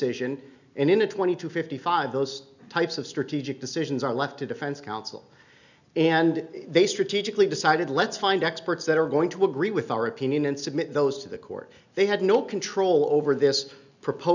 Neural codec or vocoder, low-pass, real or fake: none; 7.2 kHz; real